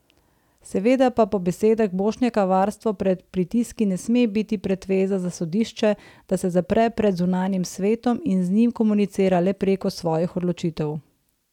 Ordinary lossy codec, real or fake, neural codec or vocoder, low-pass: none; real; none; 19.8 kHz